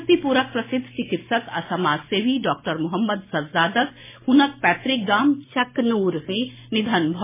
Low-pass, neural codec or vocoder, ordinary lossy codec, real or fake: 3.6 kHz; none; MP3, 16 kbps; real